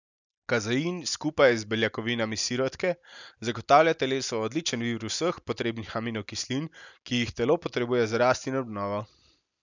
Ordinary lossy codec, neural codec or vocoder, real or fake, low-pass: none; none; real; 7.2 kHz